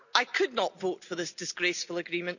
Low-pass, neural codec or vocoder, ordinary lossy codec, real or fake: 7.2 kHz; none; none; real